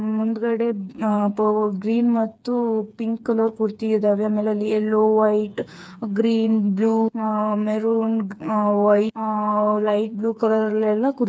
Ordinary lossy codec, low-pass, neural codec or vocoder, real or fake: none; none; codec, 16 kHz, 4 kbps, FreqCodec, smaller model; fake